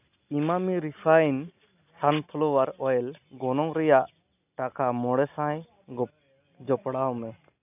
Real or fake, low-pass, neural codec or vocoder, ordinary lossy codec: real; 3.6 kHz; none; none